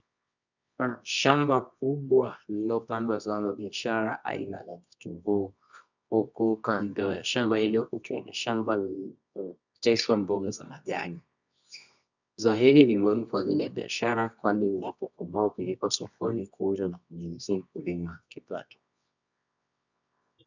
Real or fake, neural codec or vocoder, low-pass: fake; codec, 24 kHz, 0.9 kbps, WavTokenizer, medium music audio release; 7.2 kHz